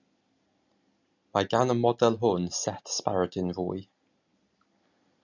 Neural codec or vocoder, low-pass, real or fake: none; 7.2 kHz; real